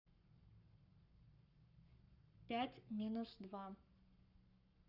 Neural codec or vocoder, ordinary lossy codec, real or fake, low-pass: codec, 44.1 kHz, 7.8 kbps, Pupu-Codec; none; fake; 5.4 kHz